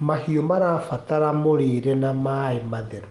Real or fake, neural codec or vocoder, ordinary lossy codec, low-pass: real; none; Opus, 24 kbps; 10.8 kHz